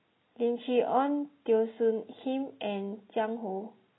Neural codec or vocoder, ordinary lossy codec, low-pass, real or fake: none; AAC, 16 kbps; 7.2 kHz; real